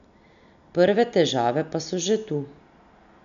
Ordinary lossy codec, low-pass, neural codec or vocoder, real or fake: none; 7.2 kHz; none; real